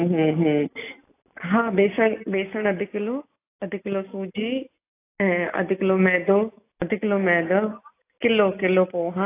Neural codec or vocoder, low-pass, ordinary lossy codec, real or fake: none; 3.6 kHz; AAC, 24 kbps; real